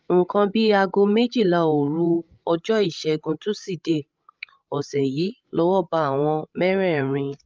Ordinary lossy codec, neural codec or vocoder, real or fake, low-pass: Opus, 24 kbps; codec, 16 kHz, 16 kbps, FreqCodec, larger model; fake; 7.2 kHz